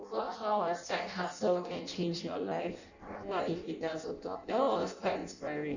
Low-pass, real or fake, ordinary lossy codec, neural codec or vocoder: 7.2 kHz; fake; none; codec, 16 kHz in and 24 kHz out, 0.6 kbps, FireRedTTS-2 codec